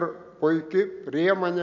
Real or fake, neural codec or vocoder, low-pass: real; none; 7.2 kHz